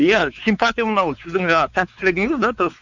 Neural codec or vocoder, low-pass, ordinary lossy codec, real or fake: codec, 16 kHz, 4 kbps, X-Codec, WavLM features, trained on Multilingual LibriSpeech; 7.2 kHz; none; fake